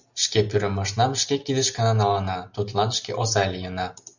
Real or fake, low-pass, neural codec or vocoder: real; 7.2 kHz; none